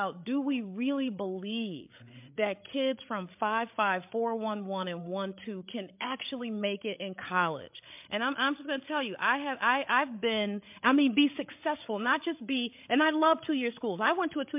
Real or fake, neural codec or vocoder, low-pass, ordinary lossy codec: fake; codec, 16 kHz, 8 kbps, FreqCodec, larger model; 3.6 kHz; MP3, 32 kbps